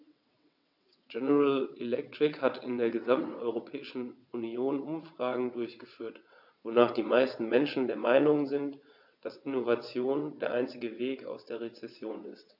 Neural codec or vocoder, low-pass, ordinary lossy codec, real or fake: vocoder, 22.05 kHz, 80 mel bands, WaveNeXt; 5.4 kHz; AAC, 48 kbps; fake